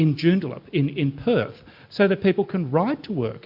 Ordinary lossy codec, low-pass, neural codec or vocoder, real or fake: MP3, 48 kbps; 5.4 kHz; none; real